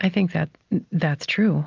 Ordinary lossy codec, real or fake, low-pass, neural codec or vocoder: Opus, 32 kbps; real; 7.2 kHz; none